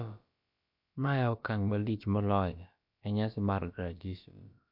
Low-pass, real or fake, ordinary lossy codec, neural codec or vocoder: 5.4 kHz; fake; MP3, 48 kbps; codec, 16 kHz, about 1 kbps, DyCAST, with the encoder's durations